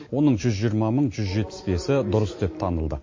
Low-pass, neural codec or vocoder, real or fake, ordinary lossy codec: 7.2 kHz; none; real; MP3, 32 kbps